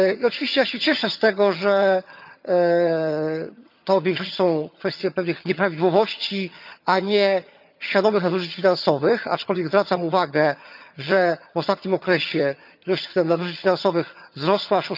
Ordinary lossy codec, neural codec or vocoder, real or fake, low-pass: none; vocoder, 22.05 kHz, 80 mel bands, HiFi-GAN; fake; 5.4 kHz